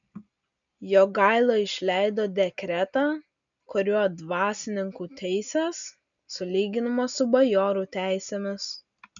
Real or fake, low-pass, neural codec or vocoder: real; 7.2 kHz; none